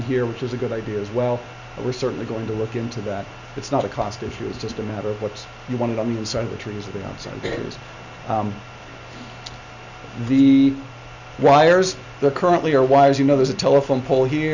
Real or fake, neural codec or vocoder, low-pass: real; none; 7.2 kHz